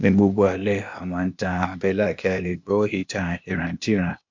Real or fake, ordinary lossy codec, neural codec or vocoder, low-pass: fake; MP3, 48 kbps; codec, 16 kHz, 0.8 kbps, ZipCodec; 7.2 kHz